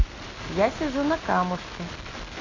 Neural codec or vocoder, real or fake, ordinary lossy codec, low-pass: none; real; AAC, 32 kbps; 7.2 kHz